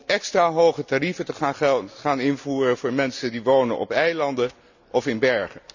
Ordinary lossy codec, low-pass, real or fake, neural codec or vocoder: none; 7.2 kHz; real; none